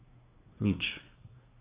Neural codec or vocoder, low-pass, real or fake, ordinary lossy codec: codec, 24 kHz, 1 kbps, SNAC; 3.6 kHz; fake; Opus, 64 kbps